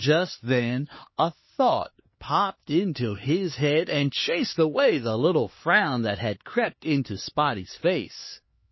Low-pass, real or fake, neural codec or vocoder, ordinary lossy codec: 7.2 kHz; fake; codec, 16 kHz, 2 kbps, X-Codec, HuBERT features, trained on LibriSpeech; MP3, 24 kbps